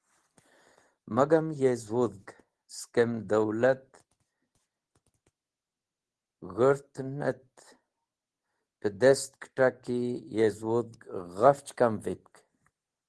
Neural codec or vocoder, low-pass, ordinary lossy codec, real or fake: none; 10.8 kHz; Opus, 16 kbps; real